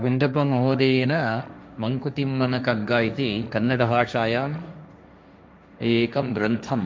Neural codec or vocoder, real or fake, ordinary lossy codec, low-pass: codec, 16 kHz, 1.1 kbps, Voila-Tokenizer; fake; none; none